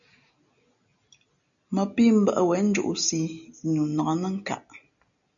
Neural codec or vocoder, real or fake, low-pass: none; real; 7.2 kHz